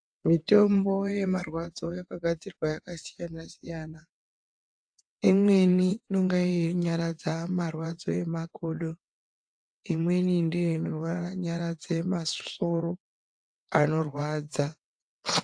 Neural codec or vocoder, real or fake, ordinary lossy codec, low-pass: vocoder, 22.05 kHz, 80 mel bands, WaveNeXt; fake; AAC, 64 kbps; 9.9 kHz